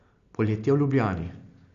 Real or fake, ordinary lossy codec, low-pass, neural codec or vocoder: real; Opus, 64 kbps; 7.2 kHz; none